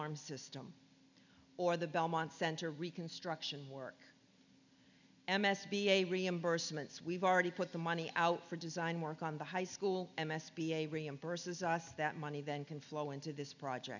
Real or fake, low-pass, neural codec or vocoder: real; 7.2 kHz; none